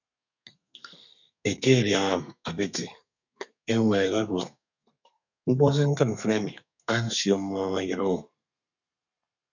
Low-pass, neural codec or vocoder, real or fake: 7.2 kHz; codec, 32 kHz, 1.9 kbps, SNAC; fake